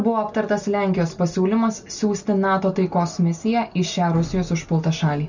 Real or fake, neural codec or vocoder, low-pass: real; none; 7.2 kHz